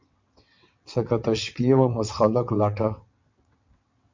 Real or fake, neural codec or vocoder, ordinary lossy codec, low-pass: fake; vocoder, 22.05 kHz, 80 mel bands, WaveNeXt; MP3, 64 kbps; 7.2 kHz